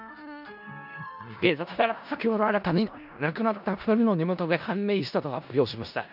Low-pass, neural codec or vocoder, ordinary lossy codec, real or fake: 5.4 kHz; codec, 16 kHz in and 24 kHz out, 0.4 kbps, LongCat-Audio-Codec, four codebook decoder; none; fake